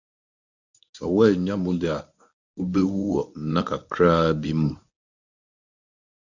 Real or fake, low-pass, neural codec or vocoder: fake; 7.2 kHz; codec, 24 kHz, 0.9 kbps, WavTokenizer, medium speech release version 1